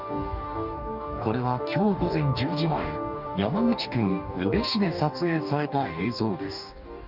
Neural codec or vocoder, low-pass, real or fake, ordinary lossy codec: codec, 44.1 kHz, 2.6 kbps, DAC; 5.4 kHz; fake; none